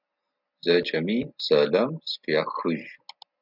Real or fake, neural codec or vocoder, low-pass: real; none; 5.4 kHz